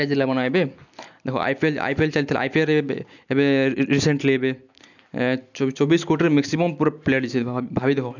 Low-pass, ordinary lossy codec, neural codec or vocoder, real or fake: 7.2 kHz; none; none; real